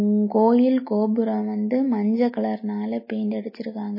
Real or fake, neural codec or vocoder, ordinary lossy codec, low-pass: real; none; MP3, 24 kbps; 5.4 kHz